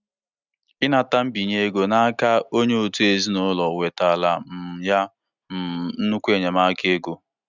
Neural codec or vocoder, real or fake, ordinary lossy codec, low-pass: none; real; none; 7.2 kHz